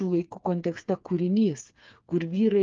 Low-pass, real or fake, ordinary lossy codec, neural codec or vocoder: 7.2 kHz; fake; Opus, 32 kbps; codec, 16 kHz, 4 kbps, FreqCodec, smaller model